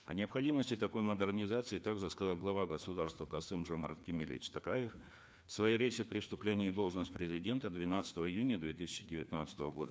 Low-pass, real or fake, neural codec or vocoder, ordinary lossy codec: none; fake; codec, 16 kHz, 2 kbps, FreqCodec, larger model; none